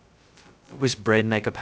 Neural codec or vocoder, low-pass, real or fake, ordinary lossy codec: codec, 16 kHz, 0.2 kbps, FocalCodec; none; fake; none